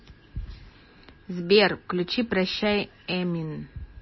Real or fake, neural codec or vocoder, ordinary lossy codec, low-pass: real; none; MP3, 24 kbps; 7.2 kHz